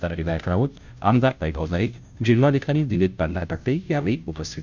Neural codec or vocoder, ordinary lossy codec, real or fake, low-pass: codec, 16 kHz, 0.5 kbps, FunCodec, trained on Chinese and English, 25 frames a second; none; fake; 7.2 kHz